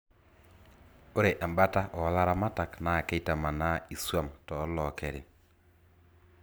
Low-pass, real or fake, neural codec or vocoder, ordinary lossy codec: none; real; none; none